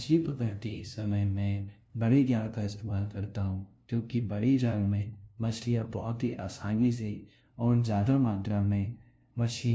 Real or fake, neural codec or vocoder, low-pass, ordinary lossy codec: fake; codec, 16 kHz, 0.5 kbps, FunCodec, trained on LibriTTS, 25 frames a second; none; none